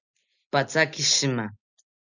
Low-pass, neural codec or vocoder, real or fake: 7.2 kHz; none; real